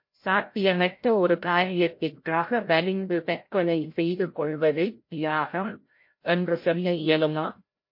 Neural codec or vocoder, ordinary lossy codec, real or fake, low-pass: codec, 16 kHz, 0.5 kbps, FreqCodec, larger model; MP3, 32 kbps; fake; 5.4 kHz